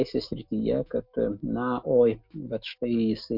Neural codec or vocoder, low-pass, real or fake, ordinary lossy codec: autoencoder, 48 kHz, 128 numbers a frame, DAC-VAE, trained on Japanese speech; 5.4 kHz; fake; MP3, 48 kbps